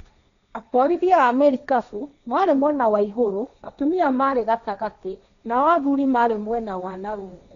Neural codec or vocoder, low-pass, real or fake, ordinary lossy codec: codec, 16 kHz, 1.1 kbps, Voila-Tokenizer; 7.2 kHz; fake; none